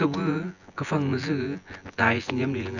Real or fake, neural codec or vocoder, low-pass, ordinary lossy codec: fake; vocoder, 24 kHz, 100 mel bands, Vocos; 7.2 kHz; none